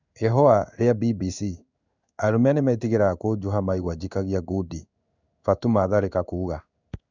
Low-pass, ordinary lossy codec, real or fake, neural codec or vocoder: 7.2 kHz; none; fake; codec, 16 kHz in and 24 kHz out, 1 kbps, XY-Tokenizer